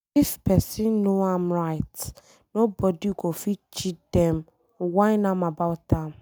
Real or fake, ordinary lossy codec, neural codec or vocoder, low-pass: real; none; none; none